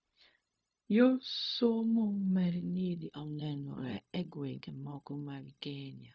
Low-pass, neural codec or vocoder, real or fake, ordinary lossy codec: none; codec, 16 kHz, 0.4 kbps, LongCat-Audio-Codec; fake; none